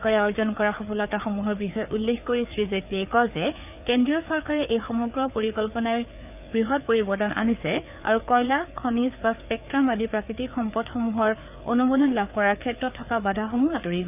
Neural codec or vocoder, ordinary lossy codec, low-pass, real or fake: codec, 16 kHz, 4 kbps, FreqCodec, larger model; none; 3.6 kHz; fake